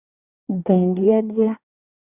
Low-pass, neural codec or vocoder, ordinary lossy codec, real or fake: 3.6 kHz; codec, 24 kHz, 1 kbps, SNAC; Opus, 64 kbps; fake